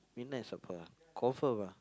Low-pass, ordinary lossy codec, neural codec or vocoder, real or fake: none; none; none; real